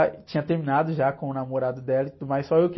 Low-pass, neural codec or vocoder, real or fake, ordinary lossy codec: 7.2 kHz; none; real; MP3, 24 kbps